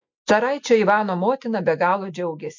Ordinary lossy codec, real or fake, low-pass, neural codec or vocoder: MP3, 64 kbps; real; 7.2 kHz; none